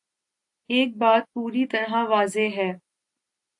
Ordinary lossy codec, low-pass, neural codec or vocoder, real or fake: AAC, 64 kbps; 10.8 kHz; none; real